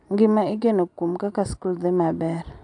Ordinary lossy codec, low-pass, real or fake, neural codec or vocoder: AAC, 64 kbps; 9.9 kHz; real; none